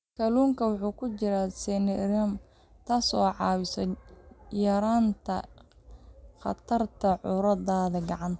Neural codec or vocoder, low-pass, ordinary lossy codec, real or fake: none; none; none; real